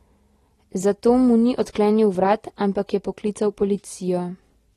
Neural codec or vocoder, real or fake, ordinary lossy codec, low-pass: none; real; AAC, 32 kbps; 19.8 kHz